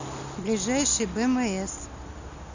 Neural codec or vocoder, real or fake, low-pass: none; real; 7.2 kHz